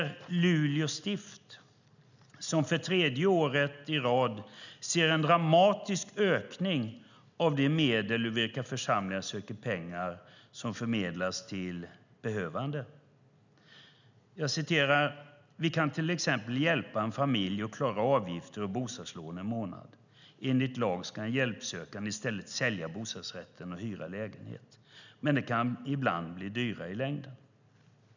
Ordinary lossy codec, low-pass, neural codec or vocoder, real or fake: none; 7.2 kHz; none; real